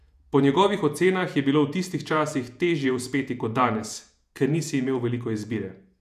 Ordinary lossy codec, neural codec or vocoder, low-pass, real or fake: none; vocoder, 48 kHz, 128 mel bands, Vocos; 14.4 kHz; fake